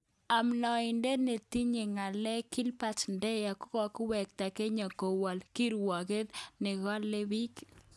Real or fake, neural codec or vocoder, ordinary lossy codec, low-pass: real; none; none; none